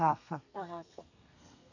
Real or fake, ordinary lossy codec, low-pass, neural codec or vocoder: fake; none; 7.2 kHz; codec, 32 kHz, 1.9 kbps, SNAC